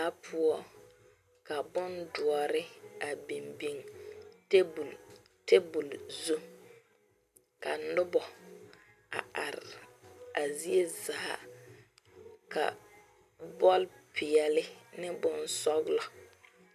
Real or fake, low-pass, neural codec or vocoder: real; 14.4 kHz; none